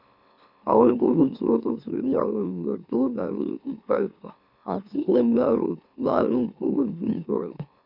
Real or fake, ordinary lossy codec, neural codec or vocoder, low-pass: fake; none; autoencoder, 44.1 kHz, a latent of 192 numbers a frame, MeloTTS; 5.4 kHz